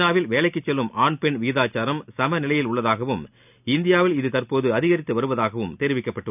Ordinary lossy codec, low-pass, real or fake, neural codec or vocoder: none; 3.6 kHz; real; none